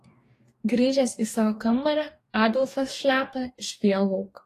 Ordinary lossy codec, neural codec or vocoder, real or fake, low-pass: AAC, 48 kbps; codec, 44.1 kHz, 2.6 kbps, DAC; fake; 14.4 kHz